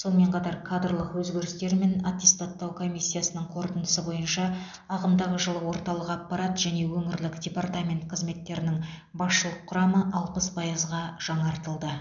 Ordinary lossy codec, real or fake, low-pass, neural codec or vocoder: none; real; 7.2 kHz; none